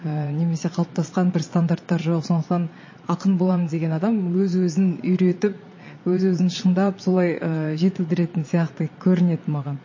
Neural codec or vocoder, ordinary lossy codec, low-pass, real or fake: vocoder, 22.05 kHz, 80 mel bands, Vocos; MP3, 32 kbps; 7.2 kHz; fake